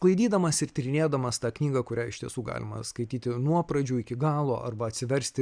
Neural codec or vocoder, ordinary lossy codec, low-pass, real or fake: none; MP3, 96 kbps; 9.9 kHz; real